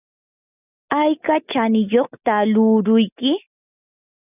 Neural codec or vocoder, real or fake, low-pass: none; real; 3.6 kHz